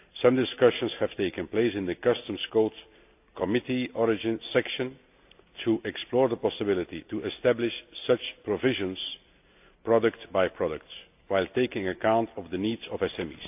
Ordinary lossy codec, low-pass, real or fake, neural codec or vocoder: AAC, 32 kbps; 3.6 kHz; real; none